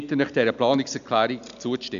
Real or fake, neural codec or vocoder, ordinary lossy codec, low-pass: real; none; none; 7.2 kHz